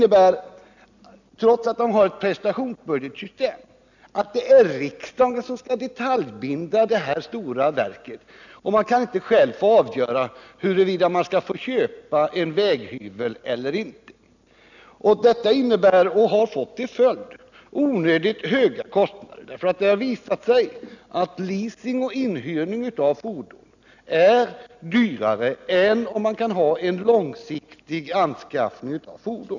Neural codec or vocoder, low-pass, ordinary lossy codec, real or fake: none; 7.2 kHz; none; real